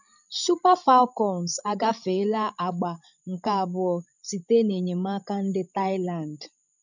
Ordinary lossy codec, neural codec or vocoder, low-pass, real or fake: none; codec, 16 kHz, 16 kbps, FreqCodec, larger model; 7.2 kHz; fake